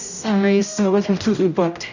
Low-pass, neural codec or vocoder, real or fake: 7.2 kHz; codec, 16 kHz, 0.5 kbps, X-Codec, HuBERT features, trained on general audio; fake